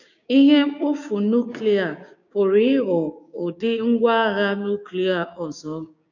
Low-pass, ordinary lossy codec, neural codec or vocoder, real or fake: 7.2 kHz; none; codec, 16 kHz, 6 kbps, DAC; fake